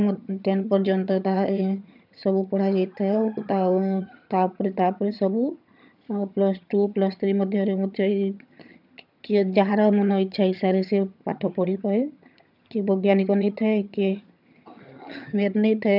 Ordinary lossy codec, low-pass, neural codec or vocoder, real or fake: none; 5.4 kHz; vocoder, 22.05 kHz, 80 mel bands, HiFi-GAN; fake